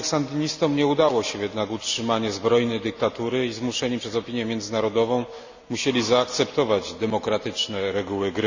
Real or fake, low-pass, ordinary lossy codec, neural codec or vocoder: real; 7.2 kHz; Opus, 64 kbps; none